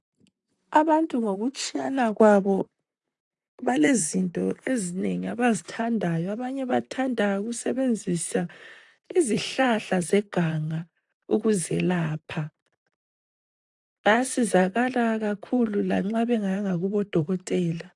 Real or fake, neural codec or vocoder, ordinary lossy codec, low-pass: fake; vocoder, 44.1 kHz, 128 mel bands, Pupu-Vocoder; AAC, 64 kbps; 10.8 kHz